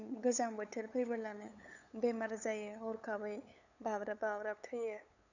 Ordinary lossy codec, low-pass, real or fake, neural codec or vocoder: none; 7.2 kHz; fake; codec, 16 kHz, 8 kbps, FunCodec, trained on LibriTTS, 25 frames a second